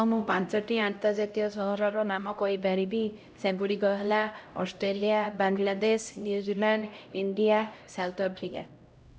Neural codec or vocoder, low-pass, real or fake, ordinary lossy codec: codec, 16 kHz, 0.5 kbps, X-Codec, HuBERT features, trained on LibriSpeech; none; fake; none